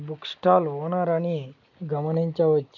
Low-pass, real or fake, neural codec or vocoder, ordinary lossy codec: 7.2 kHz; real; none; none